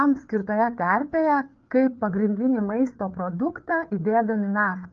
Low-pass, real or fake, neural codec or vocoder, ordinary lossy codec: 7.2 kHz; fake; codec, 16 kHz, 4 kbps, FreqCodec, larger model; Opus, 32 kbps